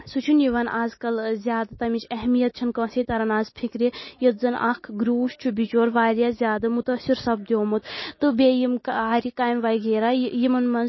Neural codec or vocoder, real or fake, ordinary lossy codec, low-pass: none; real; MP3, 24 kbps; 7.2 kHz